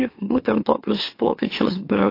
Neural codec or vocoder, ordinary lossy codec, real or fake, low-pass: autoencoder, 44.1 kHz, a latent of 192 numbers a frame, MeloTTS; AAC, 32 kbps; fake; 5.4 kHz